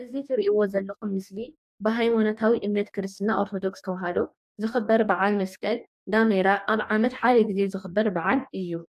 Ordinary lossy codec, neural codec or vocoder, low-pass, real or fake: AAC, 96 kbps; codec, 44.1 kHz, 2.6 kbps, DAC; 14.4 kHz; fake